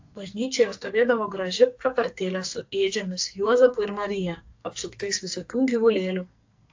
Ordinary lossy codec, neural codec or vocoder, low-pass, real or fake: AAC, 48 kbps; codec, 44.1 kHz, 2.6 kbps, SNAC; 7.2 kHz; fake